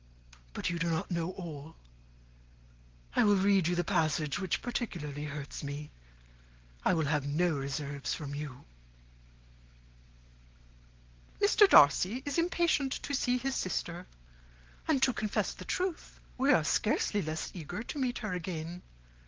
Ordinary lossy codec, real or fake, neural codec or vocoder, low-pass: Opus, 16 kbps; real; none; 7.2 kHz